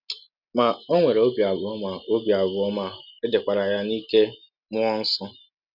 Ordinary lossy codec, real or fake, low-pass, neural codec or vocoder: none; real; 5.4 kHz; none